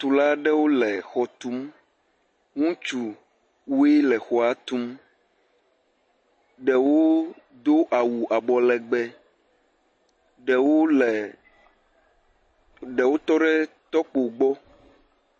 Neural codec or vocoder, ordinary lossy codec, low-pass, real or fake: none; MP3, 32 kbps; 9.9 kHz; real